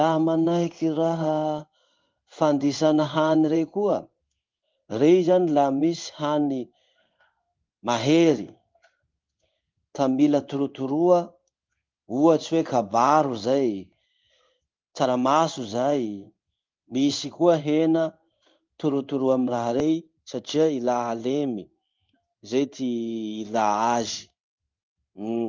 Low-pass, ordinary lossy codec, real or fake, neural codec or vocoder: 7.2 kHz; Opus, 32 kbps; fake; codec, 16 kHz in and 24 kHz out, 1 kbps, XY-Tokenizer